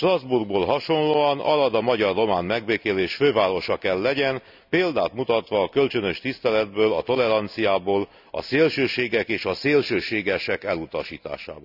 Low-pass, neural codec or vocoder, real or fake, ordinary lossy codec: 5.4 kHz; none; real; none